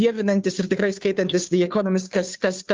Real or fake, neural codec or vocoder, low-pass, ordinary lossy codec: fake; codec, 16 kHz, 2 kbps, FunCodec, trained on Chinese and English, 25 frames a second; 7.2 kHz; Opus, 24 kbps